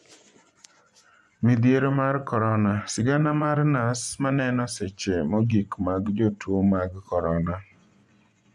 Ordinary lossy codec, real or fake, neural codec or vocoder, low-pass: none; fake; vocoder, 24 kHz, 100 mel bands, Vocos; none